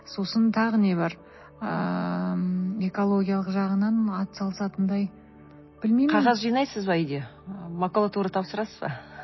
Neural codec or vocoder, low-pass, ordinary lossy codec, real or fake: none; 7.2 kHz; MP3, 24 kbps; real